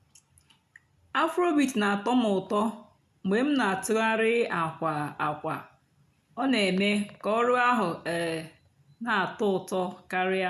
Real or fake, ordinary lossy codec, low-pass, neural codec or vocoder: real; none; 14.4 kHz; none